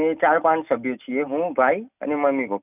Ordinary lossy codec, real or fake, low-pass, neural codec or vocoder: none; real; 3.6 kHz; none